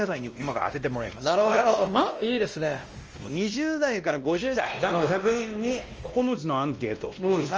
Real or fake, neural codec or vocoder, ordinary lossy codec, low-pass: fake; codec, 16 kHz, 1 kbps, X-Codec, WavLM features, trained on Multilingual LibriSpeech; Opus, 24 kbps; 7.2 kHz